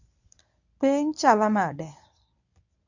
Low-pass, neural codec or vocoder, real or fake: 7.2 kHz; codec, 24 kHz, 0.9 kbps, WavTokenizer, medium speech release version 1; fake